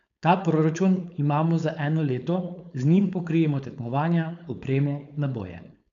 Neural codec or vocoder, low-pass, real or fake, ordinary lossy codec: codec, 16 kHz, 4.8 kbps, FACodec; 7.2 kHz; fake; none